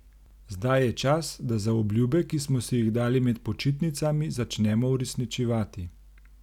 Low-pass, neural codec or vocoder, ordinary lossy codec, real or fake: 19.8 kHz; none; none; real